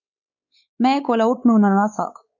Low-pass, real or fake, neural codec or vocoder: 7.2 kHz; fake; codec, 16 kHz, 4 kbps, X-Codec, WavLM features, trained on Multilingual LibriSpeech